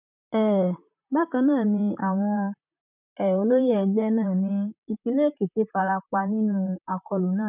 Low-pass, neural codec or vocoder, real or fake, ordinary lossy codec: 3.6 kHz; vocoder, 44.1 kHz, 128 mel bands, Pupu-Vocoder; fake; none